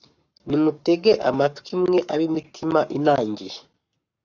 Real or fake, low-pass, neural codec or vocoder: fake; 7.2 kHz; codec, 44.1 kHz, 7.8 kbps, Pupu-Codec